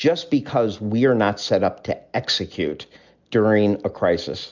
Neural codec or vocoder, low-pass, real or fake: none; 7.2 kHz; real